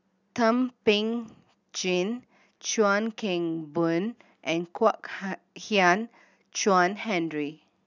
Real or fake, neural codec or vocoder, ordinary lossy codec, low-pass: real; none; none; 7.2 kHz